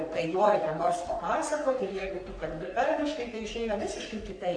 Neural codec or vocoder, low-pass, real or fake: codec, 44.1 kHz, 3.4 kbps, Pupu-Codec; 9.9 kHz; fake